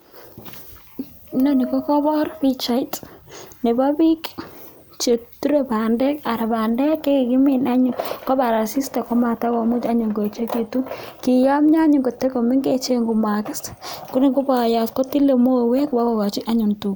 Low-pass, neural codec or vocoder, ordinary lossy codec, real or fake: none; none; none; real